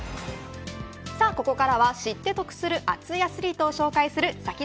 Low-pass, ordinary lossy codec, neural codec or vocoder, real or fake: none; none; none; real